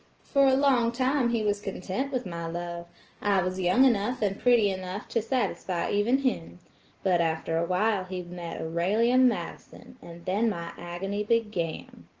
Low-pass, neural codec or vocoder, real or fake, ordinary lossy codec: 7.2 kHz; none; real; Opus, 16 kbps